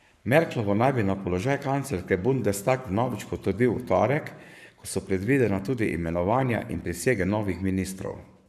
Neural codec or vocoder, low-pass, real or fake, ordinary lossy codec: codec, 44.1 kHz, 7.8 kbps, Pupu-Codec; 14.4 kHz; fake; none